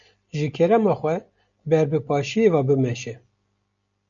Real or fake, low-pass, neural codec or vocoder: real; 7.2 kHz; none